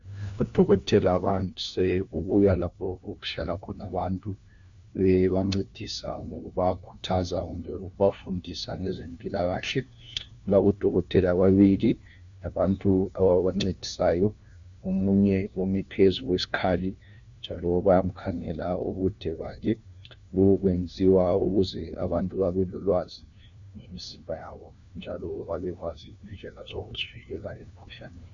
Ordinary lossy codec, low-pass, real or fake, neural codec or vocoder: Opus, 64 kbps; 7.2 kHz; fake; codec, 16 kHz, 1 kbps, FunCodec, trained on LibriTTS, 50 frames a second